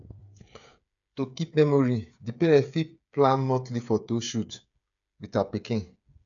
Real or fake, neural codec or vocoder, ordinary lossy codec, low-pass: fake; codec, 16 kHz, 8 kbps, FreqCodec, smaller model; none; 7.2 kHz